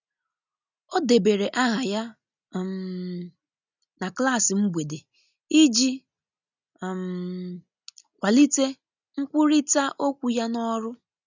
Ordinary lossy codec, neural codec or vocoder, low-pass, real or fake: none; none; 7.2 kHz; real